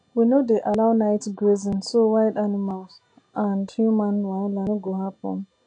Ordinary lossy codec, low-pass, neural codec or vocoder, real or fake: AAC, 48 kbps; 9.9 kHz; none; real